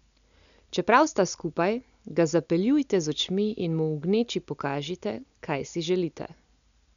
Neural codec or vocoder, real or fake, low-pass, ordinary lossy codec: none; real; 7.2 kHz; none